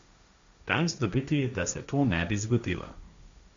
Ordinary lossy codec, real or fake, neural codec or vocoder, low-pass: MP3, 64 kbps; fake; codec, 16 kHz, 1.1 kbps, Voila-Tokenizer; 7.2 kHz